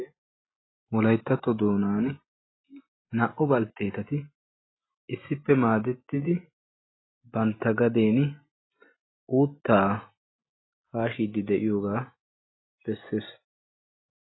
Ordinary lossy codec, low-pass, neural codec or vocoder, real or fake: AAC, 16 kbps; 7.2 kHz; none; real